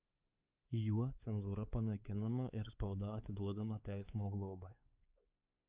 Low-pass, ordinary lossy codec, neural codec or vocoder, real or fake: 3.6 kHz; Opus, 24 kbps; codec, 16 kHz, 4 kbps, X-Codec, WavLM features, trained on Multilingual LibriSpeech; fake